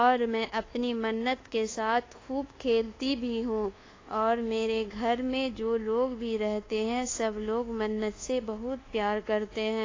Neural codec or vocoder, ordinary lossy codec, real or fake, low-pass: codec, 24 kHz, 1.2 kbps, DualCodec; AAC, 32 kbps; fake; 7.2 kHz